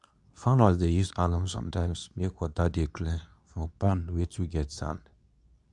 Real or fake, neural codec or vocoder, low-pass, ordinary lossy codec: fake; codec, 24 kHz, 0.9 kbps, WavTokenizer, medium speech release version 2; 10.8 kHz; none